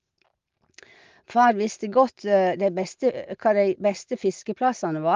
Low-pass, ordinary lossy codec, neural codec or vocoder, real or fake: 7.2 kHz; Opus, 32 kbps; none; real